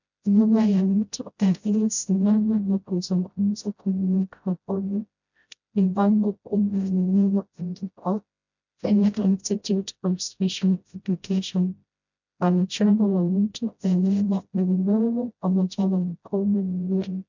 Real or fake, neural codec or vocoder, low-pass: fake; codec, 16 kHz, 0.5 kbps, FreqCodec, smaller model; 7.2 kHz